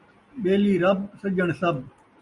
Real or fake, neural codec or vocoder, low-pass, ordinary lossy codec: real; none; 10.8 kHz; Opus, 64 kbps